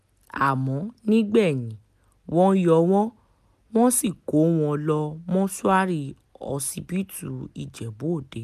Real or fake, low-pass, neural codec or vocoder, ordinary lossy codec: real; 14.4 kHz; none; none